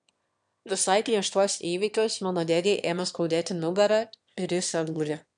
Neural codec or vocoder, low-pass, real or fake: autoencoder, 22.05 kHz, a latent of 192 numbers a frame, VITS, trained on one speaker; 9.9 kHz; fake